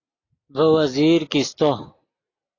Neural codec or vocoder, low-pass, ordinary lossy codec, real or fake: none; 7.2 kHz; AAC, 32 kbps; real